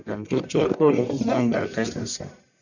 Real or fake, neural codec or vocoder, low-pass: fake; codec, 44.1 kHz, 1.7 kbps, Pupu-Codec; 7.2 kHz